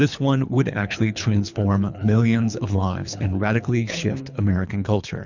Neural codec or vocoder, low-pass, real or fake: codec, 24 kHz, 3 kbps, HILCodec; 7.2 kHz; fake